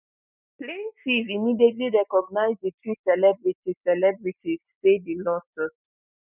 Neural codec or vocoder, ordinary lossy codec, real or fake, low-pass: none; none; real; 3.6 kHz